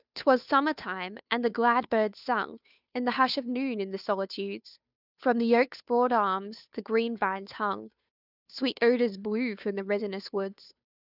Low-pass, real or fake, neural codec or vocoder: 5.4 kHz; fake; codec, 16 kHz, 2 kbps, FunCodec, trained on Chinese and English, 25 frames a second